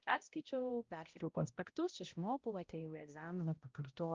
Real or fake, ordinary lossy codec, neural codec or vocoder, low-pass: fake; Opus, 24 kbps; codec, 16 kHz, 0.5 kbps, X-Codec, HuBERT features, trained on balanced general audio; 7.2 kHz